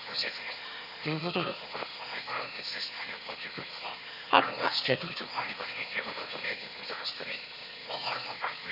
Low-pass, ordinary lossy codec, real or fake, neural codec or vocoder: 5.4 kHz; none; fake; autoencoder, 22.05 kHz, a latent of 192 numbers a frame, VITS, trained on one speaker